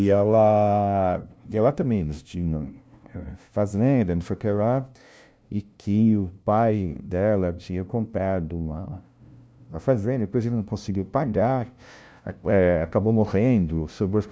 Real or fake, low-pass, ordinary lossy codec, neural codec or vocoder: fake; none; none; codec, 16 kHz, 0.5 kbps, FunCodec, trained on LibriTTS, 25 frames a second